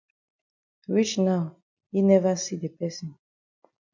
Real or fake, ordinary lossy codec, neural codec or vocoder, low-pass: real; MP3, 64 kbps; none; 7.2 kHz